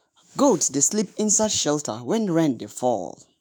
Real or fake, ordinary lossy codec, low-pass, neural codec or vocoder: fake; none; none; autoencoder, 48 kHz, 128 numbers a frame, DAC-VAE, trained on Japanese speech